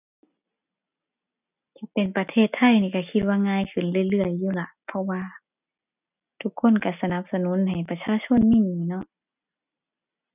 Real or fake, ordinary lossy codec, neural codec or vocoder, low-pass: real; none; none; 3.6 kHz